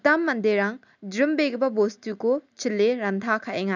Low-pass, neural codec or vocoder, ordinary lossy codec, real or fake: 7.2 kHz; none; none; real